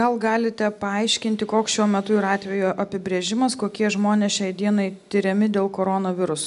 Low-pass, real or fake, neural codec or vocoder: 10.8 kHz; real; none